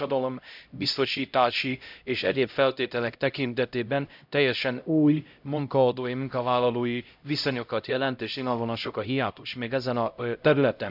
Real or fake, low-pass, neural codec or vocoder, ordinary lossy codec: fake; 5.4 kHz; codec, 16 kHz, 0.5 kbps, X-Codec, HuBERT features, trained on LibriSpeech; none